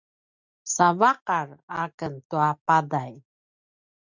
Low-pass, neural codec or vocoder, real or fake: 7.2 kHz; none; real